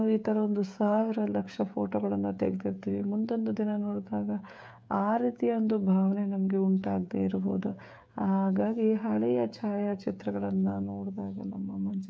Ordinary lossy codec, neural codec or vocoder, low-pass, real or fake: none; codec, 16 kHz, 16 kbps, FreqCodec, smaller model; none; fake